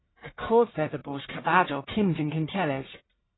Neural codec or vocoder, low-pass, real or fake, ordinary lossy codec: codec, 44.1 kHz, 1.7 kbps, Pupu-Codec; 7.2 kHz; fake; AAC, 16 kbps